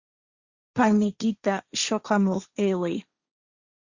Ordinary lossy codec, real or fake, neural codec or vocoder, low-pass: Opus, 64 kbps; fake; codec, 16 kHz, 1.1 kbps, Voila-Tokenizer; 7.2 kHz